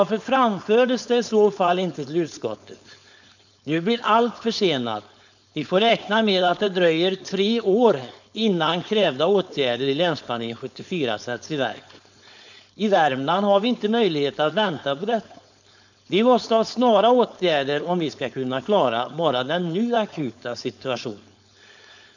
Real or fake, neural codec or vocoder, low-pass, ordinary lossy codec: fake; codec, 16 kHz, 4.8 kbps, FACodec; 7.2 kHz; none